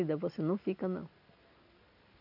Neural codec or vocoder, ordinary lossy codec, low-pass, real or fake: none; none; 5.4 kHz; real